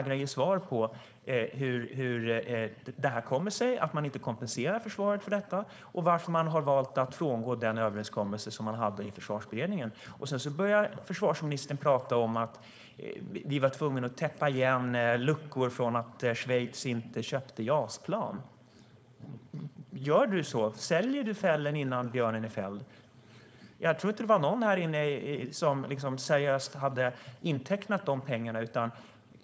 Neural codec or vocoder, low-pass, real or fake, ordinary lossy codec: codec, 16 kHz, 4.8 kbps, FACodec; none; fake; none